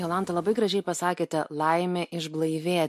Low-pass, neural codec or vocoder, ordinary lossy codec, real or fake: 14.4 kHz; none; MP3, 64 kbps; real